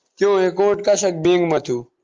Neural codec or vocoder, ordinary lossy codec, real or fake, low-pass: none; Opus, 24 kbps; real; 7.2 kHz